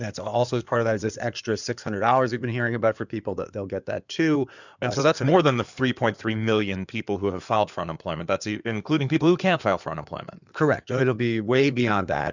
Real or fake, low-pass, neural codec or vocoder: fake; 7.2 kHz; codec, 16 kHz in and 24 kHz out, 2.2 kbps, FireRedTTS-2 codec